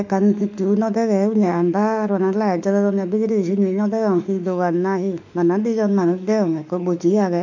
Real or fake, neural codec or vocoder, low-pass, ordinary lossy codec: fake; autoencoder, 48 kHz, 32 numbers a frame, DAC-VAE, trained on Japanese speech; 7.2 kHz; none